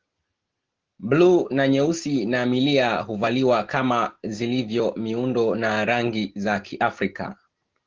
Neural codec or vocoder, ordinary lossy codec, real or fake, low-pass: none; Opus, 16 kbps; real; 7.2 kHz